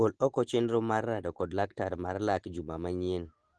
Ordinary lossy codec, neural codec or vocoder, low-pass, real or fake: Opus, 16 kbps; none; 9.9 kHz; real